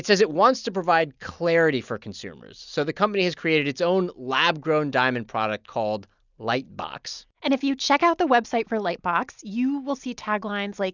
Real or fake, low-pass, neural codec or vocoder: real; 7.2 kHz; none